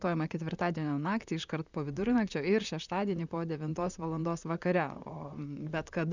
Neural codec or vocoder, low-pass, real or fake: vocoder, 44.1 kHz, 128 mel bands, Pupu-Vocoder; 7.2 kHz; fake